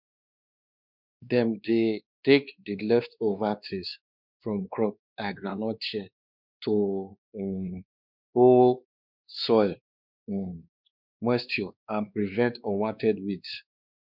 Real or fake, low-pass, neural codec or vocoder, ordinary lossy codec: fake; 5.4 kHz; codec, 16 kHz, 2 kbps, X-Codec, WavLM features, trained on Multilingual LibriSpeech; none